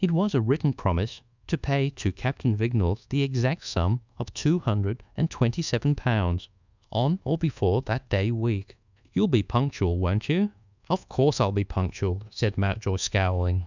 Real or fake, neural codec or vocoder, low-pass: fake; codec, 24 kHz, 1.2 kbps, DualCodec; 7.2 kHz